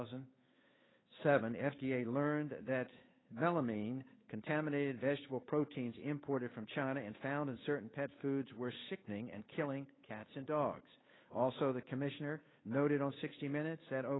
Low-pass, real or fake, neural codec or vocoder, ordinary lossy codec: 7.2 kHz; fake; codec, 16 kHz, 8 kbps, FunCodec, trained on Chinese and English, 25 frames a second; AAC, 16 kbps